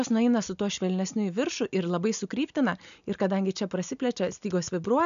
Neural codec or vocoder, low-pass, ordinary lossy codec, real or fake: none; 7.2 kHz; MP3, 96 kbps; real